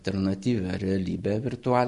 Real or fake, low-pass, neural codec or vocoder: real; 10.8 kHz; none